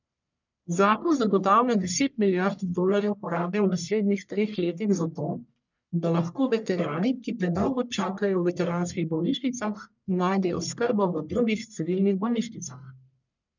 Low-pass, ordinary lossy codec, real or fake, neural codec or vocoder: 7.2 kHz; none; fake; codec, 44.1 kHz, 1.7 kbps, Pupu-Codec